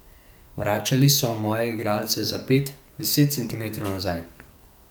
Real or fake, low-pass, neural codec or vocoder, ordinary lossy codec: fake; none; codec, 44.1 kHz, 2.6 kbps, SNAC; none